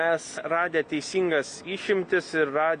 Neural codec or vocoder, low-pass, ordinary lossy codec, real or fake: none; 9.9 kHz; Opus, 64 kbps; real